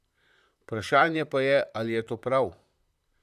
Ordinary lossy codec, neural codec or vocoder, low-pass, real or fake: none; vocoder, 44.1 kHz, 128 mel bands, Pupu-Vocoder; 14.4 kHz; fake